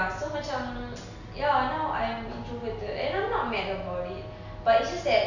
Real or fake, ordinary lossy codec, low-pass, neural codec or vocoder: real; none; 7.2 kHz; none